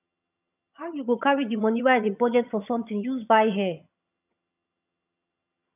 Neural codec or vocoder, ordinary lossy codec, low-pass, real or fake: vocoder, 22.05 kHz, 80 mel bands, HiFi-GAN; none; 3.6 kHz; fake